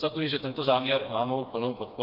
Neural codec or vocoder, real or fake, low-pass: codec, 16 kHz, 2 kbps, FreqCodec, smaller model; fake; 5.4 kHz